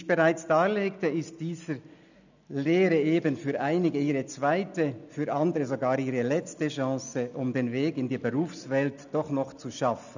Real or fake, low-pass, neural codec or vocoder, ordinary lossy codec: real; 7.2 kHz; none; none